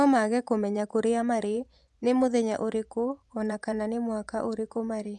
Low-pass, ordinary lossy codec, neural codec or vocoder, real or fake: none; none; vocoder, 24 kHz, 100 mel bands, Vocos; fake